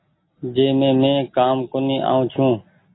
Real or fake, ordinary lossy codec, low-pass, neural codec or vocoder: real; AAC, 16 kbps; 7.2 kHz; none